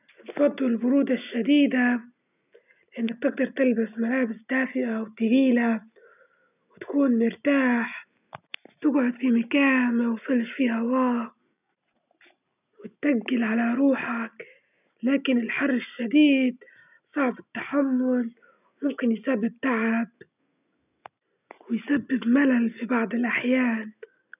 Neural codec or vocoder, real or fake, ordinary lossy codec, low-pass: none; real; none; 3.6 kHz